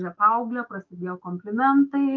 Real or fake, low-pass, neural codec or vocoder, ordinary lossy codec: fake; 7.2 kHz; autoencoder, 48 kHz, 128 numbers a frame, DAC-VAE, trained on Japanese speech; Opus, 24 kbps